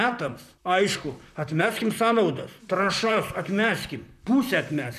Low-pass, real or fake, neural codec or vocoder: 14.4 kHz; fake; vocoder, 44.1 kHz, 128 mel bands, Pupu-Vocoder